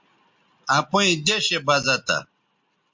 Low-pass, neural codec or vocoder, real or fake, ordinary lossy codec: 7.2 kHz; none; real; MP3, 48 kbps